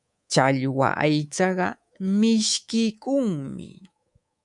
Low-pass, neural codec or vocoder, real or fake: 10.8 kHz; codec, 24 kHz, 3.1 kbps, DualCodec; fake